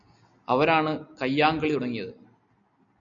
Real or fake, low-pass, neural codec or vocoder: real; 7.2 kHz; none